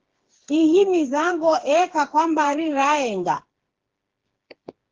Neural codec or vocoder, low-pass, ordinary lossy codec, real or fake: codec, 16 kHz, 4 kbps, FreqCodec, smaller model; 7.2 kHz; Opus, 16 kbps; fake